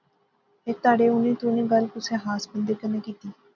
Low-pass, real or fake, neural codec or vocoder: 7.2 kHz; real; none